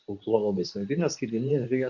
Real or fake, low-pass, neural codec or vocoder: fake; 7.2 kHz; codec, 24 kHz, 0.9 kbps, WavTokenizer, medium speech release version 2